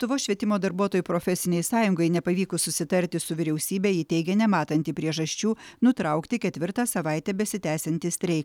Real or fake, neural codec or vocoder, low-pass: real; none; 19.8 kHz